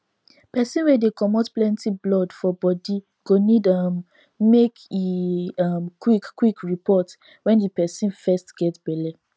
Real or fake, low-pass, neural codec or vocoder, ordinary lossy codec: real; none; none; none